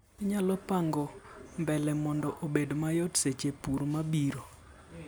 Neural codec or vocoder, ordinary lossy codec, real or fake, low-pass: none; none; real; none